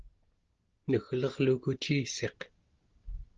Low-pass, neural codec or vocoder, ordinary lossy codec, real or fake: 7.2 kHz; none; Opus, 16 kbps; real